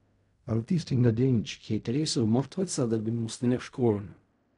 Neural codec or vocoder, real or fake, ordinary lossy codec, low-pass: codec, 16 kHz in and 24 kHz out, 0.4 kbps, LongCat-Audio-Codec, fine tuned four codebook decoder; fake; none; 10.8 kHz